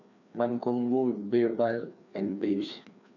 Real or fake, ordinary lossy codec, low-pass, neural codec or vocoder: fake; none; 7.2 kHz; codec, 16 kHz, 2 kbps, FreqCodec, larger model